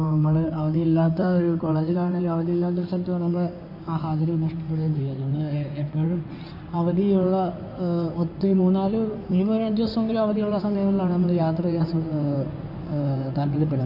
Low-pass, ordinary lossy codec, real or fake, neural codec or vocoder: 5.4 kHz; none; fake; codec, 16 kHz in and 24 kHz out, 2.2 kbps, FireRedTTS-2 codec